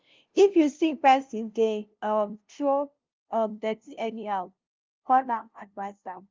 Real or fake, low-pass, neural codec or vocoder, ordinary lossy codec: fake; 7.2 kHz; codec, 16 kHz, 0.5 kbps, FunCodec, trained on LibriTTS, 25 frames a second; Opus, 24 kbps